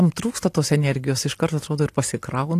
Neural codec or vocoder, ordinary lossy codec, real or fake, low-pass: none; AAC, 64 kbps; real; 14.4 kHz